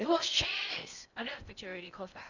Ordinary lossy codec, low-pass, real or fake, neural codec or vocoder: none; 7.2 kHz; fake; codec, 16 kHz in and 24 kHz out, 0.6 kbps, FocalCodec, streaming, 4096 codes